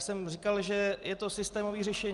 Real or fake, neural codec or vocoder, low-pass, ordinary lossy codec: real; none; 10.8 kHz; Opus, 32 kbps